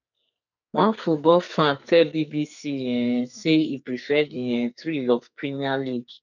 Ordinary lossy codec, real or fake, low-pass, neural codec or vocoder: none; fake; 7.2 kHz; codec, 44.1 kHz, 2.6 kbps, SNAC